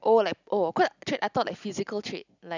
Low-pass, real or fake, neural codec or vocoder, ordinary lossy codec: 7.2 kHz; real; none; none